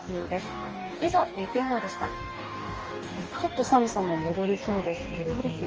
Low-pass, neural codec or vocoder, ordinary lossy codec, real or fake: 7.2 kHz; codec, 44.1 kHz, 2.6 kbps, DAC; Opus, 24 kbps; fake